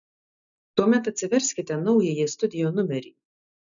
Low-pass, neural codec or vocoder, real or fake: 7.2 kHz; none; real